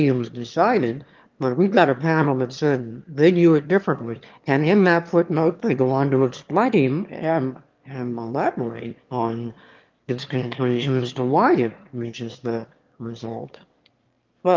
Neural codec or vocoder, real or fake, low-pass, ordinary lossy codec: autoencoder, 22.05 kHz, a latent of 192 numbers a frame, VITS, trained on one speaker; fake; 7.2 kHz; Opus, 32 kbps